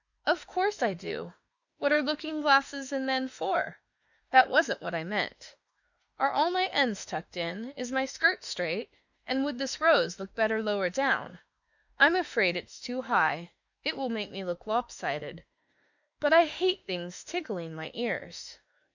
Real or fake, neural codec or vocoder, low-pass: fake; autoencoder, 48 kHz, 32 numbers a frame, DAC-VAE, trained on Japanese speech; 7.2 kHz